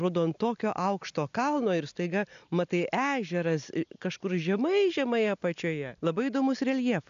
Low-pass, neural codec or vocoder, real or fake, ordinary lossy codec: 7.2 kHz; none; real; AAC, 96 kbps